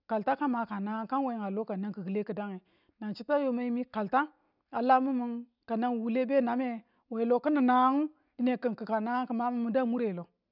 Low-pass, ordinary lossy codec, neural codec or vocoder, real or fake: 5.4 kHz; none; none; real